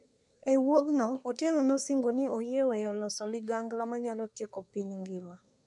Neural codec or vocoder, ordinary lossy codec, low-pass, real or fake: codec, 24 kHz, 1 kbps, SNAC; none; 10.8 kHz; fake